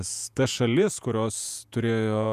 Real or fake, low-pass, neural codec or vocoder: fake; 14.4 kHz; vocoder, 48 kHz, 128 mel bands, Vocos